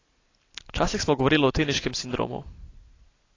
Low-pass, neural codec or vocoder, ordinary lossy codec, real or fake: 7.2 kHz; none; AAC, 32 kbps; real